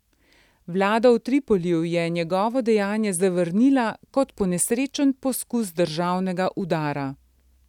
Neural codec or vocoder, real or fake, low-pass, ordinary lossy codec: none; real; 19.8 kHz; none